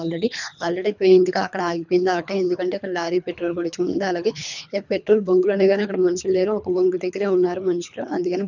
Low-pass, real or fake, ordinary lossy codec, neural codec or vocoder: 7.2 kHz; fake; none; codec, 24 kHz, 3 kbps, HILCodec